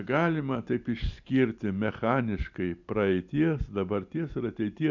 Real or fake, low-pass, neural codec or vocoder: real; 7.2 kHz; none